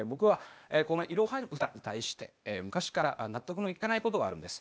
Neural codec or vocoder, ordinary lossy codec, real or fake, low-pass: codec, 16 kHz, 0.8 kbps, ZipCodec; none; fake; none